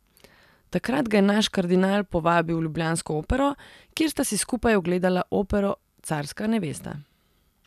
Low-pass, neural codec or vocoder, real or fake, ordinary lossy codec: 14.4 kHz; none; real; none